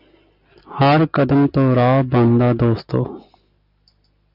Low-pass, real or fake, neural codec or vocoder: 5.4 kHz; real; none